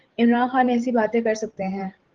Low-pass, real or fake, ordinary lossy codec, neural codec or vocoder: 7.2 kHz; fake; Opus, 16 kbps; codec, 16 kHz, 16 kbps, FreqCodec, larger model